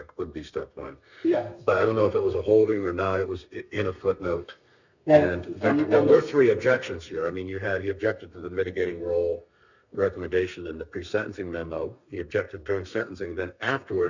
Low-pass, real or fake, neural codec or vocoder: 7.2 kHz; fake; codec, 32 kHz, 1.9 kbps, SNAC